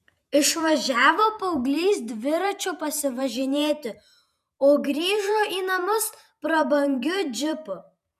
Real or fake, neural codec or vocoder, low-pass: fake; vocoder, 48 kHz, 128 mel bands, Vocos; 14.4 kHz